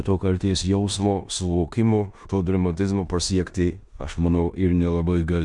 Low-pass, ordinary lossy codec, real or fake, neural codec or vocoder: 10.8 kHz; Opus, 64 kbps; fake; codec, 16 kHz in and 24 kHz out, 0.9 kbps, LongCat-Audio-Codec, four codebook decoder